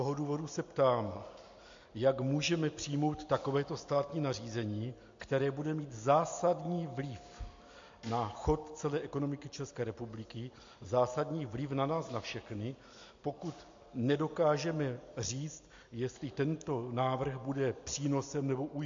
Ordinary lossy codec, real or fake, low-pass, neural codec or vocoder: MP3, 48 kbps; real; 7.2 kHz; none